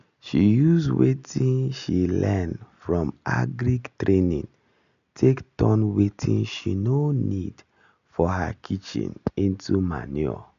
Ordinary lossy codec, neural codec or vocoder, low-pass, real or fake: none; none; 7.2 kHz; real